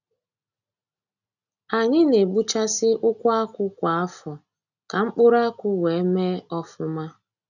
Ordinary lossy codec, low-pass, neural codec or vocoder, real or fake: none; 7.2 kHz; none; real